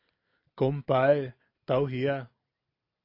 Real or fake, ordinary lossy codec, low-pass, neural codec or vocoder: real; AAC, 32 kbps; 5.4 kHz; none